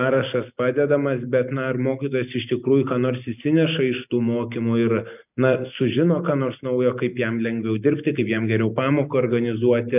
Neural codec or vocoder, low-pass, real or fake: none; 3.6 kHz; real